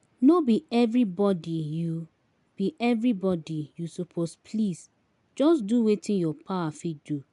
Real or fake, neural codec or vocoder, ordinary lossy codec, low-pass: real; none; MP3, 96 kbps; 10.8 kHz